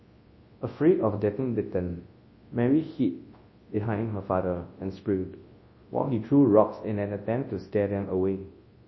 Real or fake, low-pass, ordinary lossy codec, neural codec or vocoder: fake; 7.2 kHz; MP3, 24 kbps; codec, 24 kHz, 0.9 kbps, WavTokenizer, large speech release